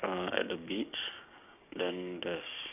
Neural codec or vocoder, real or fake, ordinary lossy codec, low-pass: codec, 16 kHz, 6 kbps, DAC; fake; none; 3.6 kHz